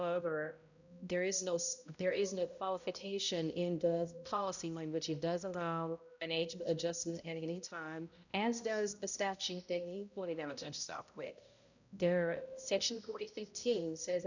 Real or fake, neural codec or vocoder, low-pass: fake; codec, 16 kHz, 0.5 kbps, X-Codec, HuBERT features, trained on balanced general audio; 7.2 kHz